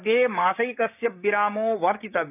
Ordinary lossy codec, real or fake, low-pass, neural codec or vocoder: none; fake; 3.6 kHz; codec, 16 kHz, 6 kbps, DAC